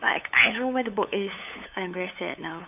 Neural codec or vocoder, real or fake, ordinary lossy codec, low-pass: codec, 16 kHz, 8 kbps, FunCodec, trained on LibriTTS, 25 frames a second; fake; none; 3.6 kHz